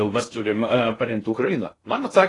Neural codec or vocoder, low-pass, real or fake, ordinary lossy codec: codec, 16 kHz in and 24 kHz out, 0.6 kbps, FocalCodec, streaming, 2048 codes; 10.8 kHz; fake; AAC, 32 kbps